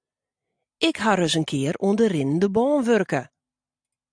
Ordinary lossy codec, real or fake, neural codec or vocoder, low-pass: AAC, 64 kbps; real; none; 9.9 kHz